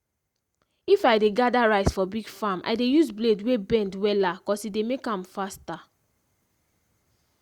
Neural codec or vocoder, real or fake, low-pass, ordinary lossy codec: none; real; 19.8 kHz; Opus, 64 kbps